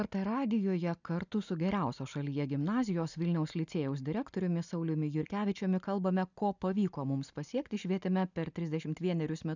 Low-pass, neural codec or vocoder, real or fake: 7.2 kHz; none; real